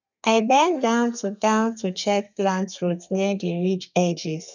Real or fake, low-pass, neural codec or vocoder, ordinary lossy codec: fake; 7.2 kHz; codec, 32 kHz, 1.9 kbps, SNAC; none